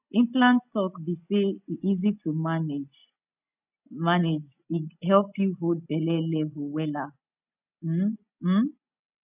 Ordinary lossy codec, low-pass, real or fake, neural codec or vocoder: none; 3.6 kHz; real; none